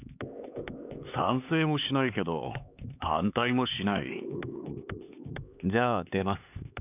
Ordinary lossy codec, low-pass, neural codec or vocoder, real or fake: none; 3.6 kHz; codec, 16 kHz, 4 kbps, X-Codec, HuBERT features, trained on LibriSpeech; fake